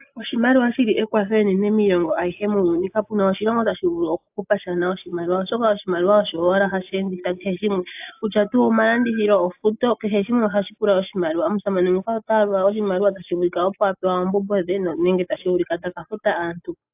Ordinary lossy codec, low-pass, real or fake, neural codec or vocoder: AAC, 32 kbps; 3.6 kHz; real; none